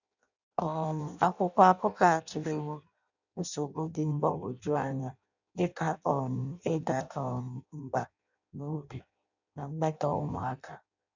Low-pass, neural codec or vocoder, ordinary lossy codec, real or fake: 7.2 kHz; codec, 16 kHz in and 24 kHz out, 0.6 kbps, FireRedTTS-2 codec; none; fake